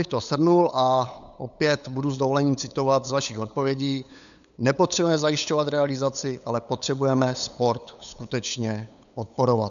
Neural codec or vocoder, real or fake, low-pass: codec, 16 kHz, 8 kbps, FunCodec, trained on LibriTTS, 25 frames a second; fake; 7.2 kHz